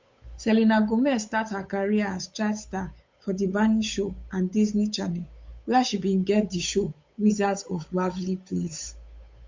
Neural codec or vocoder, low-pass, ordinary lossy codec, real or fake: codec, 16 kHz, 8 kbps, FunCodec, trained on Chinese and English, 25 frames a second; 7.2 kHz; MP3, 48 kbps; fake